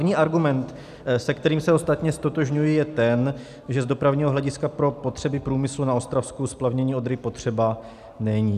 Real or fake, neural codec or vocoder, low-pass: real; none; 14.4 kHz